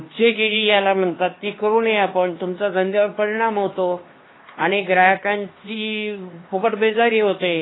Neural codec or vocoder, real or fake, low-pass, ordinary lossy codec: codec, 16 kHz, 2 kbps, X-Codec, HuBERT features, trained on LibriSpeech; fake; 7.2 kHz; AAC, 16 kbps